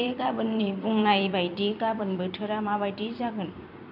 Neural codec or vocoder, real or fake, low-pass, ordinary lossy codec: vocoder, 44.1 kHz, 128 mel bands every 256 samples, BigVGAN v2; fake; 5.4 kHz; none